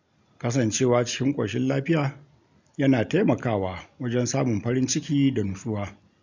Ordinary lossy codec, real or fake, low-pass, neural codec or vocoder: none; real; 7.2 kHz; none